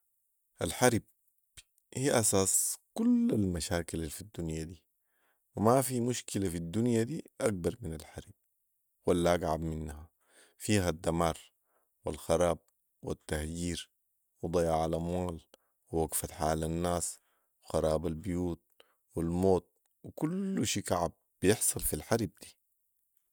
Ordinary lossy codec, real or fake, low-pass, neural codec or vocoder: none; real; none; none